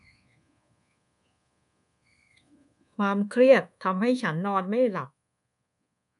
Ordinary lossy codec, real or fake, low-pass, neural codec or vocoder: none; fake; 10.8 kHz; codec, 24 kHz, 1.2 kbps, DualCodec